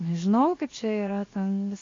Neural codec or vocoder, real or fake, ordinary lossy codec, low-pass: codec, 16 kHz, 0.7 kbps, FocalCodec; fake; AAC, 32 kbps; 7.2 kHz